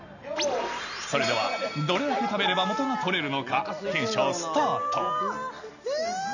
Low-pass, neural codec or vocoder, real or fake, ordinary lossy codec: 7.2 kHz; none; real; none